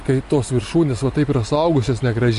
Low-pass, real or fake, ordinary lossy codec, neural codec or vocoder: 14.4 kHz; real; MP3, 48 kbps; none